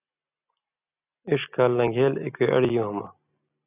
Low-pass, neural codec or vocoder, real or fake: 3.6 kHz; none; real